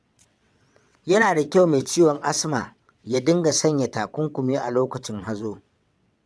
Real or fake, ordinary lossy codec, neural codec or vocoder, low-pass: fake; none; vocoder, 22.05 kHz, 80 mel bands, WaveNeXt; none